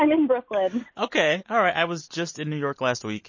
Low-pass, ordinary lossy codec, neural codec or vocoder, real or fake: 7.2 kHz; MP3, 32 kbps; none; real